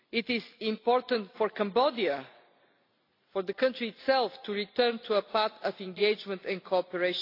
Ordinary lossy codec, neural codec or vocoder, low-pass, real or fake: AAC, 32 kbps; none; 5.4 kHz; real